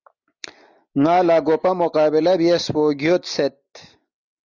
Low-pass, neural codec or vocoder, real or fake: 7.2 kHz; none; real